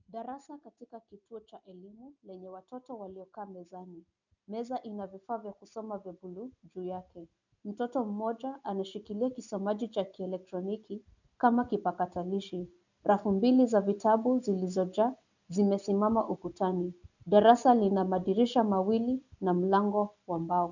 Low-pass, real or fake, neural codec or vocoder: 7.2 kHz; real; none